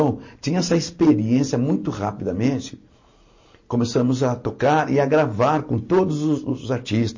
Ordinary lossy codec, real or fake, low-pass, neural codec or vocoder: MP3, 32 kbps; real; 7.2 kHz; none